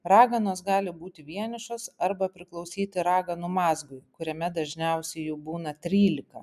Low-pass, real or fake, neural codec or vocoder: 14.4 kHz; real; none